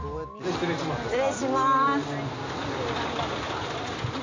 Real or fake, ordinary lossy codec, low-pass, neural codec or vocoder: real; MP3, 64 kbps; 7.2 kHz; none